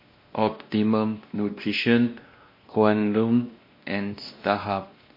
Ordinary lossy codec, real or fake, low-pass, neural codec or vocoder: MP3, 32 kbps; fake; 5.4 kHz; codec, 16 kHz, 1 kbps, X-Codec, WavLM features, trained on Multilingual LibriSpeech